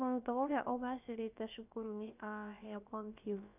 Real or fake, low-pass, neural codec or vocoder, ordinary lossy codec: fake; 3.6 kHz; codec, 16 kHz, about 1 kbps, DyCAST, with the encoder's durations; none